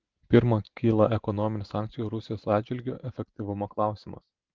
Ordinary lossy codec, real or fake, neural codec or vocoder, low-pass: Opus, 16 kbps; real; none; 7.2 kHz